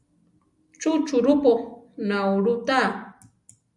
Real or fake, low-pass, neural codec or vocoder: real; 10.8 kHz; none